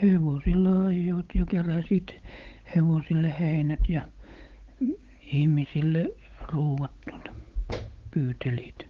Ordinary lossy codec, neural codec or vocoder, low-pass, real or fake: Opus, 32 kbps; codec, 16 kHz, 8 kbps, FunCodec, trained on Chinese and English, 25 frames a second; 7.2 kHz; fake